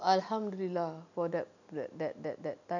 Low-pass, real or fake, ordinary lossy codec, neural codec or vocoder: 7.2 kHz; real; none; none